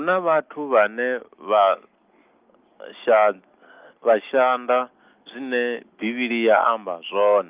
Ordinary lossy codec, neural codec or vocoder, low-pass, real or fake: Opus, 32 kbps; none; 3.6 kHz; real